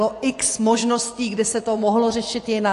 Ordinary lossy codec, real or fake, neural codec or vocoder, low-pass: AAC, 48 kbps; fake; vocoder, 24 kHz, 100 mel bands, Vocos; 10.8 kHz